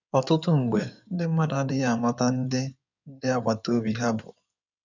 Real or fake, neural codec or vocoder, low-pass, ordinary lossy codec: fake; codec, 16 kHz in and 24 kHz out, 2.2 kbps, FireRedTTS-2 codec; 7.2 kHz; none